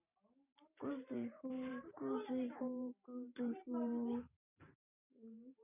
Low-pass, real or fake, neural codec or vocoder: 3.6 kHz; fake; vocoder, 44.1 kHz, 128 mel bands every 512 samples, BigVGAN v2